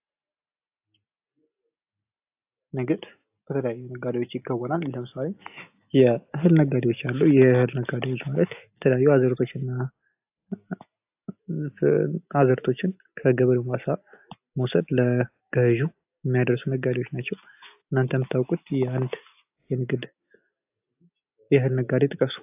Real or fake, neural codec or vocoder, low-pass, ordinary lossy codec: real; none; 3.6 kHz; AAC, 32 kbps